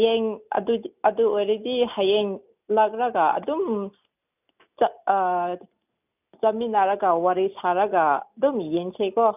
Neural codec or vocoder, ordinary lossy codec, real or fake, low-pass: none; none; real; 3.6 kHz